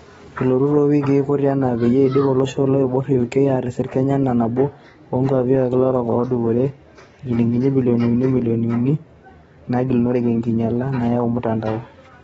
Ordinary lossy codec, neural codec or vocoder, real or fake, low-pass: AAC, 24 kbps; codec, 44.1 kHz, 7.8 kbps, DAC; fake; 19.8 kHz